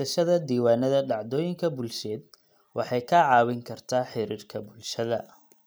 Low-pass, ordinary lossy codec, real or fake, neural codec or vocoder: none; none; real; none